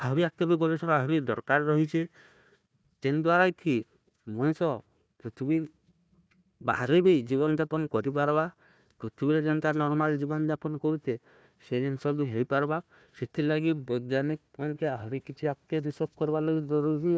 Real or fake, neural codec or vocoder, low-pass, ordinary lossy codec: fake; codec, 16 kHz, 1 kbps, FunCodec, trained on Chinese and English, 50 frames a second; none; none